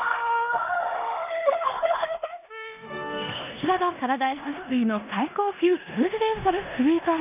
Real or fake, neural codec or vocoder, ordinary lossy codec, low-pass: fake; codec, 16 kHz in and 24 kHz out, 0.9 kbps, LongCat-Audio-Codec, four codebook decoder; none; 3.6 kHz